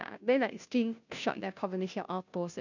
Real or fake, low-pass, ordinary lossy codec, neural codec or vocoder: fake; 7.2 kHz; none; codec, 16 kHz, 0.5 kbps, FunCodec, trained on Chinese and English, 25 frames a second